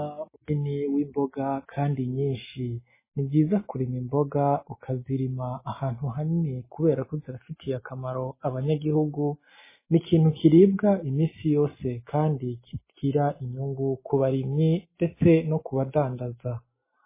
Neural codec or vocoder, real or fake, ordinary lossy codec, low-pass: none; real; MP3, 16 kbps; 3.6 kHz